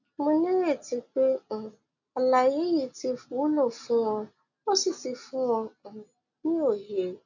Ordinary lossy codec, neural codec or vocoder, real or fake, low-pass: none; none; real; 7.2 kHz